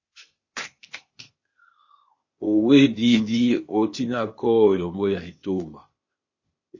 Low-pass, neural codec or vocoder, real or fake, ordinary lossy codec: 7.2 kHz; codec, 16 kHz, 0.8 kbps, ZipCodec; fake; MP3, 32 kbps